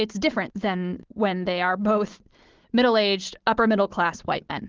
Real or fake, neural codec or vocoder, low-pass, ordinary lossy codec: real; none; 7.2 kHz; Opus, 16 kbps